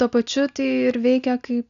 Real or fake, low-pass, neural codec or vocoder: real; 7.2 kHz; none